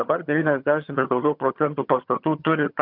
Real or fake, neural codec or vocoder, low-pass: fake; vocoder, 22.05 kHz, 80 mel bands, HiFi-GAN; 5.4 kHz